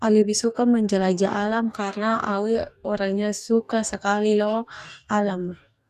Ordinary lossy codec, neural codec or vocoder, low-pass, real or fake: none; codec, 44.1 kHz, 2.6 kbps, DAC; 14.4 kHz; fake